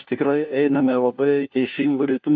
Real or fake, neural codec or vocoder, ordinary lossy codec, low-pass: fake; codec, 16 kHz, 1 kbps, FunCodec, trained on LibriTTS, 50 frames a second; AAC, 48 kbps; 7.2 kHz